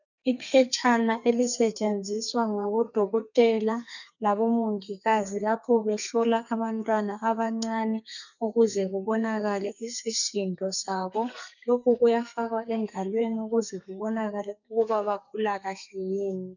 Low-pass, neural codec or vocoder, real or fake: 7.2 kHz; codec, 32 kHz, 1.9 kbps, SNAC; fake